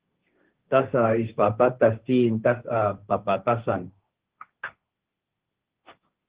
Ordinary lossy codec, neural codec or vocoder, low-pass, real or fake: Opus, 16 kbps; codec, 16 kHz, 1.1 kbps, Voila-Tokenizer; 3.6 kHz; fake